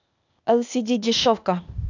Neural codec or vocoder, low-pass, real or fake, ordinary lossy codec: codec, 16 kHz, 0.8 kbps, ZipCodec; 7.2 kHz; fake; none